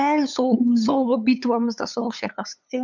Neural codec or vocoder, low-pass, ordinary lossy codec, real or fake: codec, 16 kHz, 8 kbps, FunCodec, trained on LibriTTS, 25 frames a second; 7.2 kHz; none; fake